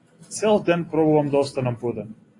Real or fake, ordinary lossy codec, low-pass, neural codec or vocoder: real; AAC, 32 kbps; 10.8 kHz; none